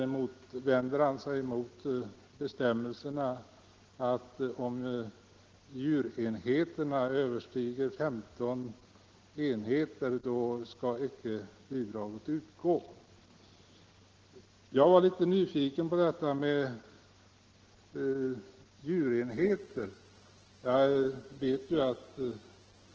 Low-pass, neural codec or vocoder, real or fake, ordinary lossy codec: 7.2 kHz; none; real; Opus, 16 kbps